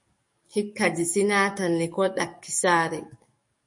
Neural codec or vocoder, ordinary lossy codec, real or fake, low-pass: codec, 44.1 kHz, 7.8 kbps, DAC; MP3, 48 kbps; fake; 10.8 kHz